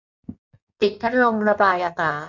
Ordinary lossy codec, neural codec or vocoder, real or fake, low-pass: none; codec, 16 kHz in and 24 kHz out, 1.1 kbps, FireRedTTS-2 codec; fake; 7.2 kHz